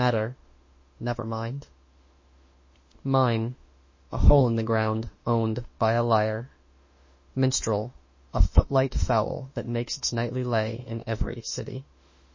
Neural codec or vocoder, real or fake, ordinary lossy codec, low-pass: autoencoder, 48 kHz, 32 numbers a frame, DAC-VAE, trained on Japanese speech; fake; MP3, 32 kbps; 7.2 kHz